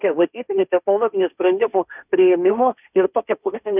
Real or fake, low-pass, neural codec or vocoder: fake; 3.6 kHz; codec, 16 kHz, 1.1 kbps, Voila-Tokenizer